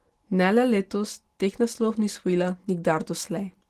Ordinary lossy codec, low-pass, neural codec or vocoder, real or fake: Opus, 16 kbps; 14.4 kHz; none; real